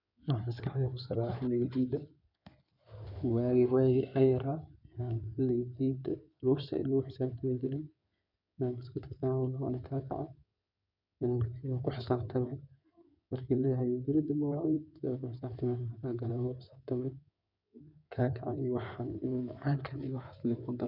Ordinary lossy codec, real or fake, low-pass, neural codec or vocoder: none; fake; 5.4 kHz; codec, 16 kHz in and 24 kHz out, 2.2 kbps, FireRedTTS-2 codec